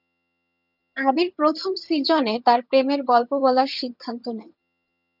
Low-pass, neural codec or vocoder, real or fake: 5.4 kHz; vocoder, 22.05 kHz, 80 mel bands, HiFi-GAN; fake